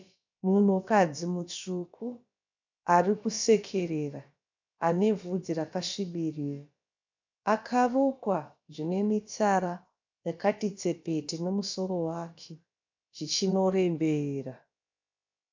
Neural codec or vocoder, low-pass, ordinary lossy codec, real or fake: codec, 16 kHz, about 1 kbps, DyCAST, with the encoder's durations; 7.2 kHz; MP3, 64 kbps; fake